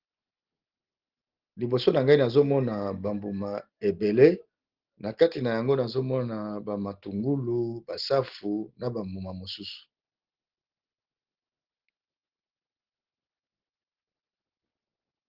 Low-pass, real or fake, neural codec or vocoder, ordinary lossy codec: 5.4 kHz; real; none; Opus, 16 kbps